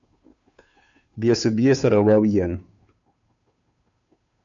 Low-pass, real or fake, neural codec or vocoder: 7.2 kHz; fake; codec, 16 kHz, 2 kbps, FunCodec, trained on Chinese and English, 25 frames a second